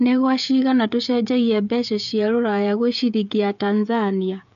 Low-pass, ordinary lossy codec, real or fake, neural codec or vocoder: 7.2 kHz; AAC, 96 kbps; fake; codec, 16 kHz, 16 kbps, FreqCodec, smaller model